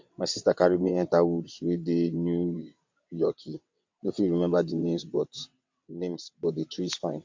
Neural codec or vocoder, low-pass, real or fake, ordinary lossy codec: vocoder, 22.05 kHz, 80 mel bands, WaveNeXt; 7.2 kHz; fake; MP3, 48 kbps